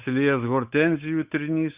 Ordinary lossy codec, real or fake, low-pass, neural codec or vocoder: Opus, 64 kbps; fake; 3.6 kHz; codec, 16 kHz, 8 kbps, FunCodec, trained on Chinese and English, 25 frames a second